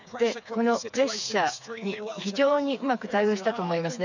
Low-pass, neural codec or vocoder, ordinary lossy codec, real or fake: 7.2 kHz; codec, 16 kHz, 4 kbps, FreqCodec, smaller model; none; fake